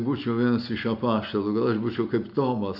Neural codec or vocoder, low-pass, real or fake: none; 5.4 kHz; real